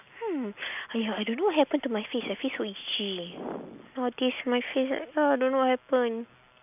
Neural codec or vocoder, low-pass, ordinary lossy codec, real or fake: none; 3.6 kHz; none; real